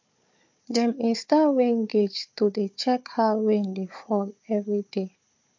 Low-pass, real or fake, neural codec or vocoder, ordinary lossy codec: 7.2 kHz; fake; codec, 16 kHz, 4 kbps, FunCodec, trained on Chinese and English, 50 frames a second; MP3, 48 kbps